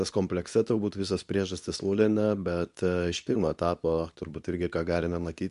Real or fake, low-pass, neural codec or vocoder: fake; 10.8 kHz; codec, 24 kHz, 0.9 kbps, WavTokenizer, medium speech release version 2